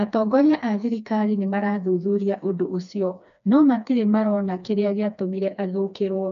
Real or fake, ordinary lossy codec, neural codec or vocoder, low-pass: fake; AAC, 96 kbps; codec, 16 kHz, 2 kbps, FreqCodec, smaller model; 7.2 kHz